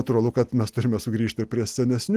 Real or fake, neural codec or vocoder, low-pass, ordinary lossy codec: real; none; 14.4 kHz; Opus, 24 kbps